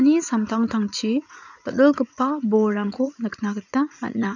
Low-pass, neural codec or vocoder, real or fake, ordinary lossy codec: 7.2 kHz; vocoder, 44.1 kHz, 80 mel bands, Vocos; fake; none